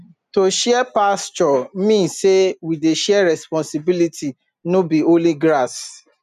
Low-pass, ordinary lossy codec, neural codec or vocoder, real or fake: 14.4 kHz; none; none; real